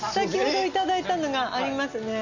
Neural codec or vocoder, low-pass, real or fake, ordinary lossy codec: none; 7.2 kHz; real; none